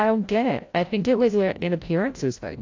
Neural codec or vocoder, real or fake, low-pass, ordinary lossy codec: codec, 16 kHz, 0.5 kbps, FreqCodec, larger model; fake; 7.2 kHz; AAC, 48 kbps